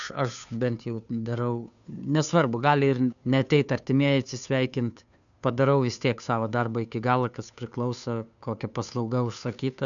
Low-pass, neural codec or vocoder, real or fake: 7.2 kHz; codec, 16 kHz, 2 kbps, FunCodec, trained on Chinese and English, 25 frames a second; fake